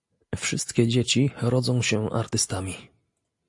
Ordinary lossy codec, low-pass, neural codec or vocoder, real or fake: AAC, 64 kbps; 10.8 kHz; none; real